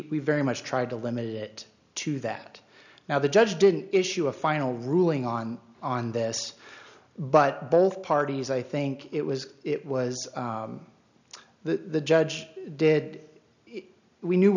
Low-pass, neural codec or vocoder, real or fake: 7.2 kHz; none; real